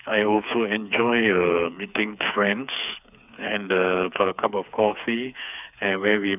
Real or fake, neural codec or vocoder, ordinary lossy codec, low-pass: fake; codec, 16 kHz, 4 kbps, FreqCodec, smaller model; none; 3.6 kHz